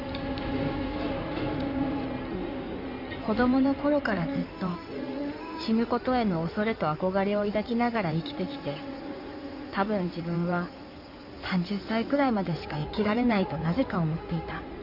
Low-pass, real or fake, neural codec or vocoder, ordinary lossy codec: 5.4 kHz; fake; codec, 16 kHz in and 24 kHz out, 2.2 kbps, FireRedTTS-2 codec; none